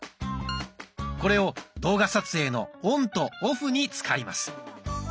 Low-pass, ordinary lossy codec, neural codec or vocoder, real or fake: none; none; none; real